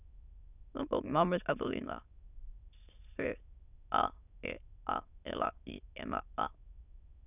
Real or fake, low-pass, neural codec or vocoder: fake; 3.6 kHz; autoencoder, 22.05 kHz, a latent of 192 numbers a frame, VITS, trained on many speakers